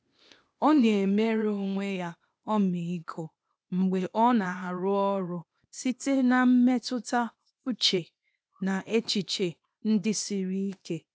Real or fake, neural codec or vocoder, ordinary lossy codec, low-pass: fake; codec, 16 kHz, 0.8 kbps, ZipCodec; none; none